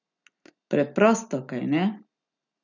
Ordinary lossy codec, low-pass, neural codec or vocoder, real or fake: none; 7.2 kHz; none; real